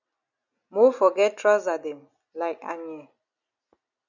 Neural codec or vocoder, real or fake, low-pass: none; real; 7.2 kHz